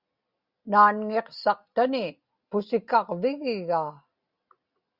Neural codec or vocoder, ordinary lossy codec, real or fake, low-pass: none; Opus, 64 kbps; real; 5.4 kHz